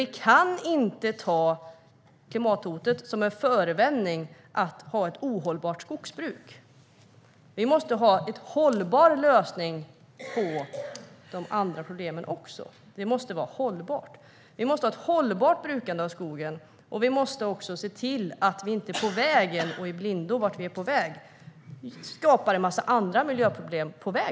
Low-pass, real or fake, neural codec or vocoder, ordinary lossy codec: none; real; none; none